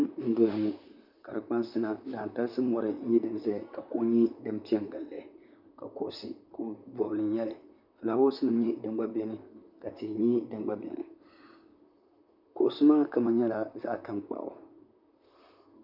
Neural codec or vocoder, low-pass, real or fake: vocoder, 44.1 kHz, 128 mel bands, Pupu-Vocoder; 5.4 kHz; fake